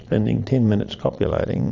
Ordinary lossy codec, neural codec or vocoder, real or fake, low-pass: AAC, 48 kbps; vocoder, 22.05 kHz, 80 mel bands, Vocos; fake; 7.2 kHz